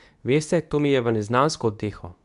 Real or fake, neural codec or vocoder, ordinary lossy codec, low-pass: fake; codec, 24 kHz, 0.9 kbps, WavTokenizer, medium speech release version 2; none; 10.8 kHz